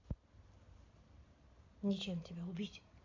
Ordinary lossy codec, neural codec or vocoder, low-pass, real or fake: AAC, 48 kbps; none; 7.2 kHz; real